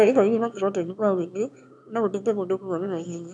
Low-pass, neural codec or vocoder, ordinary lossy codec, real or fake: none; autoencoder, 22.05 kHz, a latent of 192 numbers a frame, VITS, trained on one speaker; none; fake